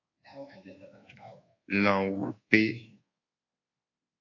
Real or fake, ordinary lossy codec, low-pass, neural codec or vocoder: fake; AAC, 32 kbps; 7.2 kHz; codec, 24 kHz, 1.2 kbps, DualCodec